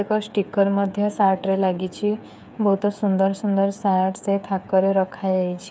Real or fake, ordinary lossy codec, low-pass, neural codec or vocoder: fake; none; none; codec, 16 kHz, 8 kbps, FreqCodec, smaller model